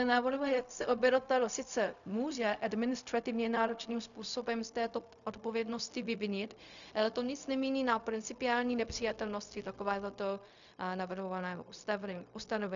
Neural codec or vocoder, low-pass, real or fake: codec, 16 kHz, 0.4 kbps, LongCat-Audio-Codec; 7.2 kHz; fake